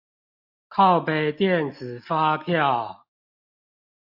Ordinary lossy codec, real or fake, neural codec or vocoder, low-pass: AAC, 48 kbps; real; none; 5.4 kHz